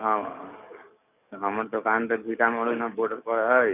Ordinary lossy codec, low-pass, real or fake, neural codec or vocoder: none; 3.6 kHz; fake; codec, 44.1 kHz, 7.8 kbps, DAC